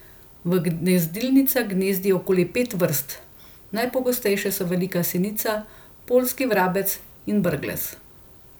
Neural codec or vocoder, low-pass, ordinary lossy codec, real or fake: none; none; none; real